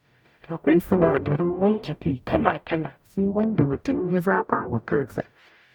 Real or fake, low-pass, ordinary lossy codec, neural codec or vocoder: fake; 19.8 kHz; none; codec, 44.1 kHz, 0.9 kbps, DAC